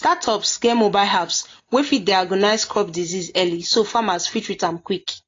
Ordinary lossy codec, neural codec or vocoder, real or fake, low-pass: AAC, 32 kbps; none; real; 7.2 kHz